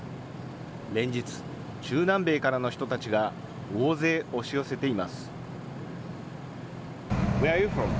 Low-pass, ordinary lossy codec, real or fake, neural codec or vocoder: none; none; real; none